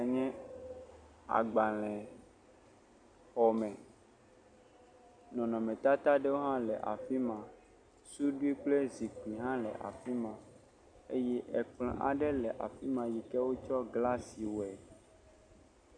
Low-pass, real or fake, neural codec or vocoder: 9.9 kHz; real; none